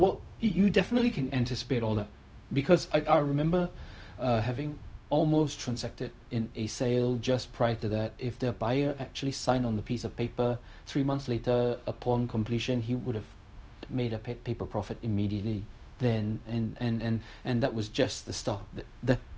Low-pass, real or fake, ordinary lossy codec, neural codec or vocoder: none; fake; none; codec, 16 kHz, 0.4 kbps, LongCat-Audio-Codec